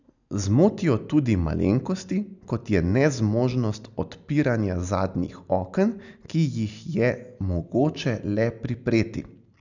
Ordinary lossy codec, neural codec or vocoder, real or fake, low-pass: none; none; real; 7.2 kHz